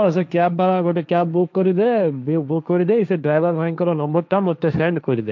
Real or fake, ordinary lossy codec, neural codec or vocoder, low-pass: fake; MP3, 64 kbps; codec, 16 kHz, 1.1 kbps, Voila-Tokenizer; 7.2 kHz